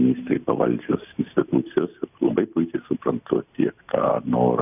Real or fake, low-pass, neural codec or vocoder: real; 3.6 kHz; none